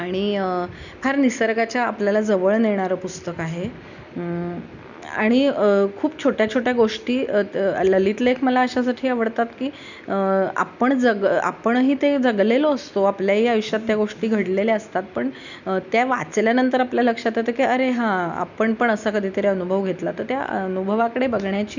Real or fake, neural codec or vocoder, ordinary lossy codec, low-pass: real; none; none; 7.2 kHz